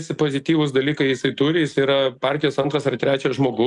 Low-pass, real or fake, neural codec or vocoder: 10.8 kHz; real; none